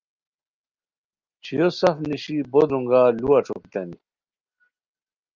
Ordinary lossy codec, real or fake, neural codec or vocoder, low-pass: Opus, 32 kbps; real; none; 7.2 kHz